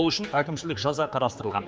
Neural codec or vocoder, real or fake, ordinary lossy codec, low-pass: codec, 16 kHz, 4 kbps, X-Codec, HuBERT features, trained on general audio; fake; none; none